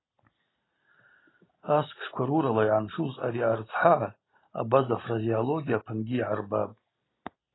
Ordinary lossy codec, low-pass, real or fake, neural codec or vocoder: AAC, 16 kbps; 7.2 kHz; real; none